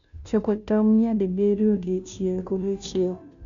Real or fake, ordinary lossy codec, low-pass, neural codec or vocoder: fake; none; 7.2 kHz; codec, 16 kHz, 0.5 kbps, FunCodec, trained on Chinese and English, 25 frames a second